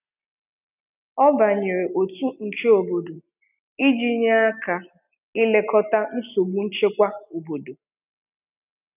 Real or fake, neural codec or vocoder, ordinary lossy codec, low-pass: real; none; none; 3.6 kHz